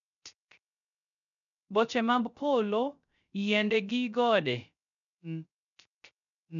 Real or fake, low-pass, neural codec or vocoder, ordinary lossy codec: fake; 7.2 kHz; codec, 16 kHz, 0.3 kbps, FocalCodec; none